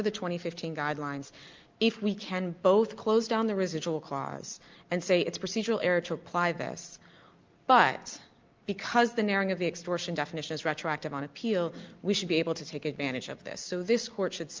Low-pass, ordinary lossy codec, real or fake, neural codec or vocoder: 7.2 kHz; Opus, 24 kbps; real; none